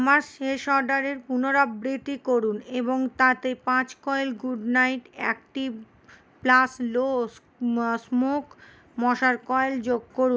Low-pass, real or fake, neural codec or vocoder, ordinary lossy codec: none; real; none; none